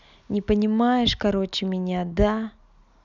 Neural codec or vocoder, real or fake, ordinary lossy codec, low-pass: none; real; none; 7.2 kHz